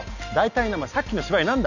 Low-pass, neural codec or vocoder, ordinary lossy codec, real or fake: 7.2 kHz; none; none; real